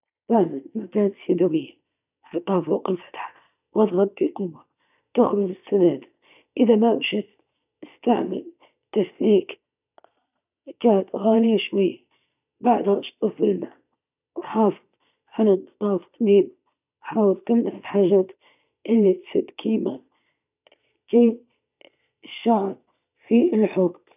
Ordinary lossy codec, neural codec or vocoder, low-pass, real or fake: none; vocoder, 22.05 kHz, 80 mel bands, Vocos; 3.6 kHz; fake